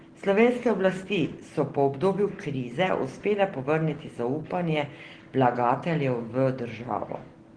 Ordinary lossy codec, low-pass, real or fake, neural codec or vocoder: Opus, 16 kbps; 9.9 kHz; real; none